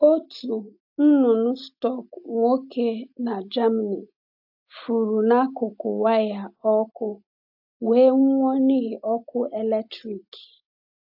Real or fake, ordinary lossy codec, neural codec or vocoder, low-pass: real; AAC, 48 kbps; none; 5.4 kHz